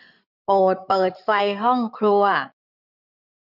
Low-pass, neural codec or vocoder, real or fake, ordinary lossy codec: 5.4 kHz; codec, 16 kHz in and 24 kHz out, 2.2 kbps, FireRedTTS-2 codec; fake; none